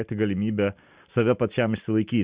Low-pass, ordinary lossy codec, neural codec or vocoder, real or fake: 3.6 kHz; Opus, 64 kbps; none; real